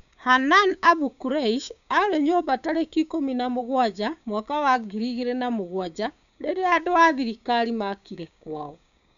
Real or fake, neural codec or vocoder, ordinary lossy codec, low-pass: fake; codec, 16 kHz, 6 kbps, DAC; none; 7.2 kHz